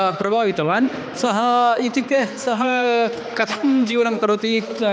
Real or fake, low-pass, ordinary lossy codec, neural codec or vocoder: fake; none; none; codec, 16 kHz, 2 kbps, X-Codec, HuBERT features, trained on balanced general audio